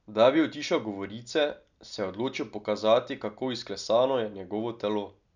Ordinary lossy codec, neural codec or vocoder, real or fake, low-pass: none; none; real; 7.2 kHz